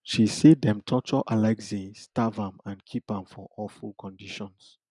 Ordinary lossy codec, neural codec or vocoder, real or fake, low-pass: none; none; real; 10.8 kHz